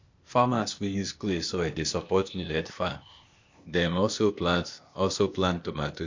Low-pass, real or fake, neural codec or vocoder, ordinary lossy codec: 7.2 kHz; fake; codec, 16 kHz, 0.8 kbps, ZipCodec; MP3, 48 kbps